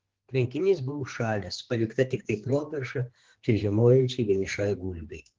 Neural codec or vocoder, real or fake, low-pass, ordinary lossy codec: codec, 16 kHz, 2 kbps, X-Codec, HuBERT features, trained on general audio; fake; 7.2 kHz; Opus, 16 kbps